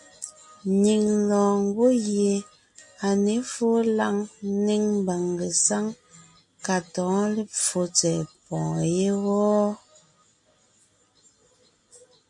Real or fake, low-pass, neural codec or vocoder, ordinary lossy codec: real; 10.8 kHz; none; MP3, 48 kbps